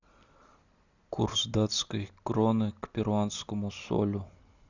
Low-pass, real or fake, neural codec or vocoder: 7.2 kHz; real; none